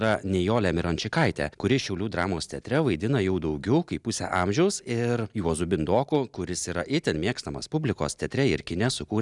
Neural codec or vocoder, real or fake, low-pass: none; real; 10.8 kHz